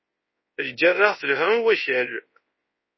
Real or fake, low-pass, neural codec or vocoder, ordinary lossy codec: fake; 7.2 kHz; codec, 24 kHz, 0.9 kbps, WavTokenizer, large speech release; MP3, 24 kbps